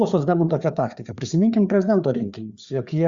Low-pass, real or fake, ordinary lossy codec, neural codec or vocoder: 7.2 kHz; fake; Opus, 64 kbps; codec, 16 kHz, 4 kbps, FreqCodec, larger model